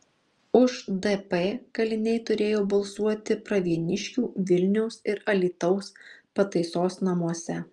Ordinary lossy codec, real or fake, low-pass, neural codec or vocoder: Opus, 32 kbps; real; 10.8 kHz; none